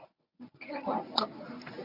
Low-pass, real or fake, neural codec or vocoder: 5.4 kHz; fake; codec, 24 kHz, 0.9 kbps, WavTokenizer, medium speech release version 1